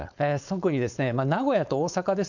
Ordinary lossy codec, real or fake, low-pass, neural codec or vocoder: none; fake; 7.2 kHz; codec, 16 kHz, 4.8 kbps, FACodec